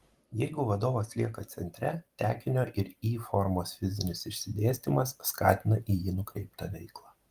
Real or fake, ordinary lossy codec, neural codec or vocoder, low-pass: real; Opus, 24 kbps; none; 14.4 kHz